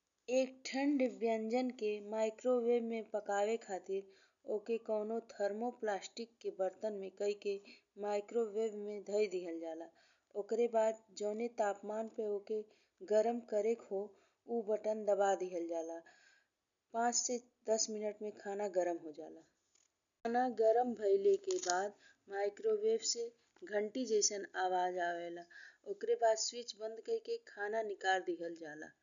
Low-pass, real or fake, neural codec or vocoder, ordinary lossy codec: 7.2 kHz; real; none; none